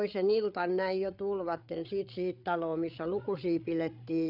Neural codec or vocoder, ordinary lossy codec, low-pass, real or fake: codec, 16 kHz, 8 kbps, FreqCodec, larger model; none; 5.4 kHz; fake